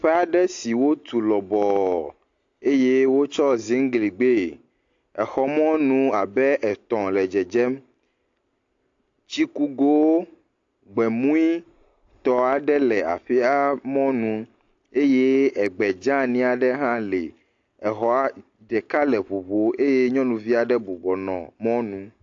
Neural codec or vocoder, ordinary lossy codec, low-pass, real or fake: none; AAC, 48 kbps; 7.2 kHz; real